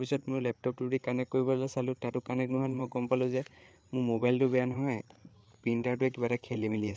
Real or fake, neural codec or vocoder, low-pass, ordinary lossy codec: fake; codec, 16 kHz, 8 kbps, FreqCodec, larger model; none; none